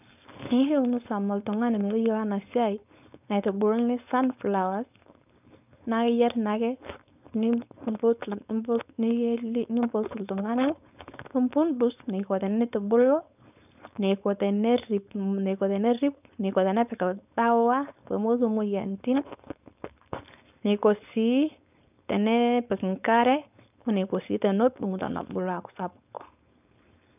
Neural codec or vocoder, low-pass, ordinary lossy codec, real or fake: codec, 16 kHz, 4.8 kbps, FACodec; 3.6 kHz; none; fake